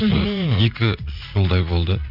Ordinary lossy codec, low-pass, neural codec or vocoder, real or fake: none; 5.4 kHz; vocoder, 44.1 kHz, 80 mel bands, Vocos; fake